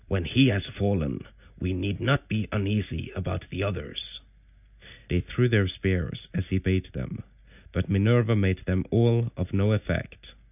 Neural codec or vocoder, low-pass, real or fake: none; 3.6 kHz; real